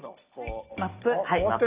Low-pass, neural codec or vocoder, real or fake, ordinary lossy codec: 3.6 kHz; none; real; Opus, 64 kbps